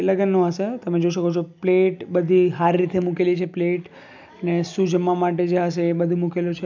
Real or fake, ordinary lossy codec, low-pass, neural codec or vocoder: real; none; 7.2 kHz; none